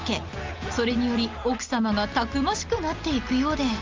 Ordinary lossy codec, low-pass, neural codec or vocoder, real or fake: Opus, 32 kbps; 7.2 kHz; none; real